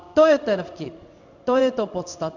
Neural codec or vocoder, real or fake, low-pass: codec, 16 kHz in and 24 kHz out, 1 kbps, XY-Tokenizer; fake; 7.2 kHz